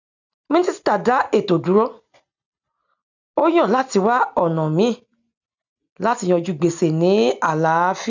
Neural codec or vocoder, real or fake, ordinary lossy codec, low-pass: none; real; none; 7.2 kHz